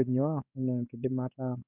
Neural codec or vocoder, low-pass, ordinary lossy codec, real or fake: codec, 16 kHz in and 24 kHz out, 1 kbps, XY-Tokenizer; 3.6 kHz; none; fake